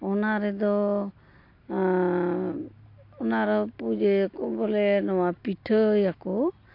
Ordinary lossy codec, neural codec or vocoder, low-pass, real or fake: AAC, 32 kbps; none; 5.4 kHz; real